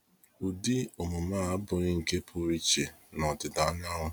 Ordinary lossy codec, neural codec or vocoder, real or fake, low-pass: none; none; real; none